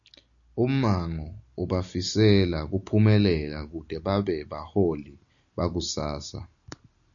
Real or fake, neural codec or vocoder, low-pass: real; none; 7.2 kHz